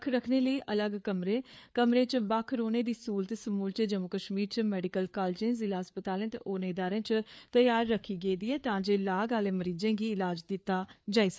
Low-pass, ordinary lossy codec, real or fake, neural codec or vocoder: none; none; fake; codec, 16 kHz, 4 kbps, FreqCodec, larger model